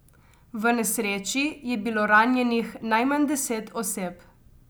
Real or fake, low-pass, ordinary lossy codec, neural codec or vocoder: real; none; none; none